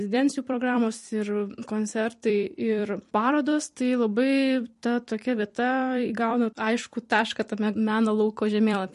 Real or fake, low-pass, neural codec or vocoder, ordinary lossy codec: fake; 14.4 kHz; vocoder, 44.1 kHz, 128 mel bands every 256 samples, BigVGAN v2; MP3, 48 kbps